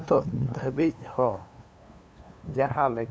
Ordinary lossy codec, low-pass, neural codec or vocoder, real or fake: none; none; codec, 16 kHz, 2 kbps, FunCodec, trained on LibriTTS, 25 frames a second; fake